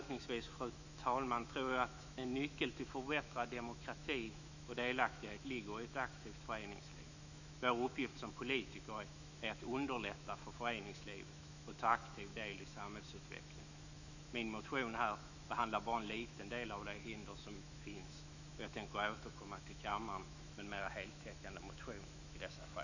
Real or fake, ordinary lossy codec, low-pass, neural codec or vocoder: fake; none; 7.2 kHz; autoencoder, 48 kHz, 128 numbers a frame, DAC-VAE, trained on Japanese speech